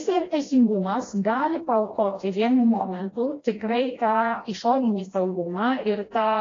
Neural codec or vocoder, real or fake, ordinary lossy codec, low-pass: codec, 16 kHz, 1 kbps, FreqCodec, smaller model; fake; AAC, 32 kbps; 7.2 kHz